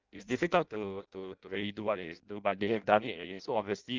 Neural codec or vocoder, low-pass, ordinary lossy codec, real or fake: codec, 16 kHz in and 24 kHz out, 0.6 kbps, FireRedTTS-2 codec; 7.2 kHz; Opus, 24 kbps; fake